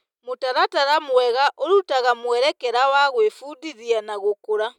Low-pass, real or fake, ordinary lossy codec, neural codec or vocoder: 19.8 kHz; real; none; none